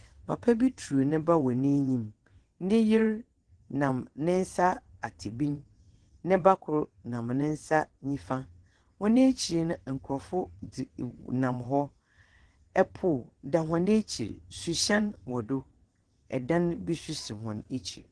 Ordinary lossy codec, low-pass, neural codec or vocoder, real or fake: Opus, 16 kbps; 9.9 kHz; vocoder, 22.05 kHz, 80 mel bands, WaveNeXt; fake